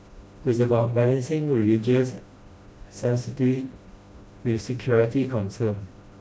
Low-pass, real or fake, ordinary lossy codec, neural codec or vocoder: none; fake; none; codec, 16 kHz, 1 kbps, FreqCodec, smaller model